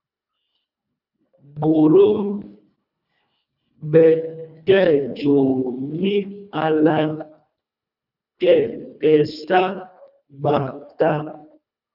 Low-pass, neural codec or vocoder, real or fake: 5.4 kHz; codec, 24 kHz, 1.5 kbps, HILCodec; fake